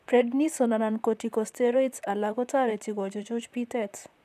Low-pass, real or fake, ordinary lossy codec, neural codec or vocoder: 14.4 kHz; fake; none; vocoder, 44.1 kHz, 128 mel bands, Pupu-Vocoder